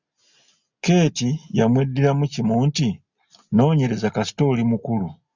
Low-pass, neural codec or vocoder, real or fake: 7.2 kHz; none; real